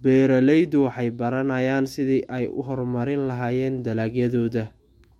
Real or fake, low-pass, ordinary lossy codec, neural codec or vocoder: fake; 19.8 kHz; MP3, 64 kbps; autoencoder, 48 kHz, 128 numbers a frame, DAC-VAE, trained on Japanese speech